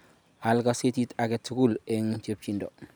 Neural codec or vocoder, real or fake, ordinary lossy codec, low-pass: none; real; none; none